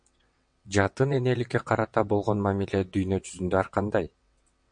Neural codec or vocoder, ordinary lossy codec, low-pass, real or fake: vocoder, 22.05 kHz, 80 mel bands, WaveNeXt; MP3, 48 kbps; 9.9 kHz; fake